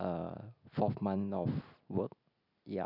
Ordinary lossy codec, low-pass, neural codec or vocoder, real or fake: none; 5.4 kHz; none; real